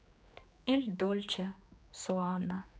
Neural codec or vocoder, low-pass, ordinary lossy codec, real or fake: codec, 16 kHz, 2 kbps, X-Codec, HuBERT features, trained on general audio; none; none; fake